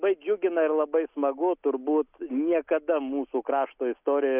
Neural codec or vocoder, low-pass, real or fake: none; 3.6 kHz; real